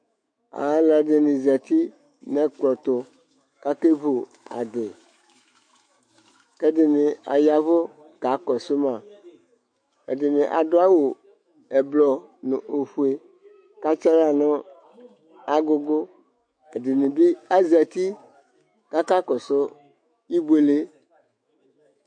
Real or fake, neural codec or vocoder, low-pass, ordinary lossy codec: fake; autoencoder, 48 kHz, 128 numbers a frame, DAC-VAE, trained on Japanese speech; 9.9 kHz; MP3, 48 kbps